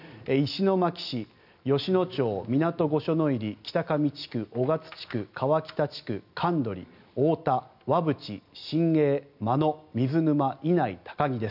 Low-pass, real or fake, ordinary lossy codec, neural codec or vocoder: 5.4 kHz; real; none; none